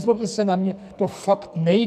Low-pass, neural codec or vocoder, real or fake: 14.4 kHz; codec, 44.1 kHz, 2.6 kbps, SNAC; fake